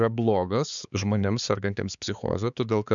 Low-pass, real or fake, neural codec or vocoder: 7.2 kHz; fake; codec, 16 kHz, 4 kbps, X-Codec, HuBERT features, trained on balanced general audio